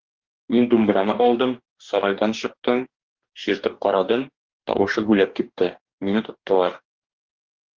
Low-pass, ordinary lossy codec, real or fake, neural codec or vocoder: 7.2 kHz; Opus, 16 kbps; fake; codec, 44.1 kHz, 2.6 kbps, DAC